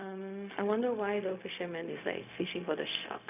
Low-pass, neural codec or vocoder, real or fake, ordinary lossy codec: 3.6 kHz; codec, 16 kHz, 0.4 kbps, LongCat-Audio-Codec; fake; none